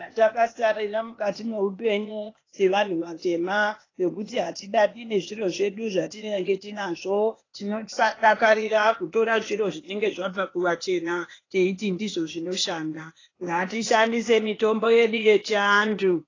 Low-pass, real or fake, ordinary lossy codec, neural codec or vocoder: 7.2 kHz; fake; AAC, 32 kbps; codec, 16 kHz, 0.8 kbps, ZipCodec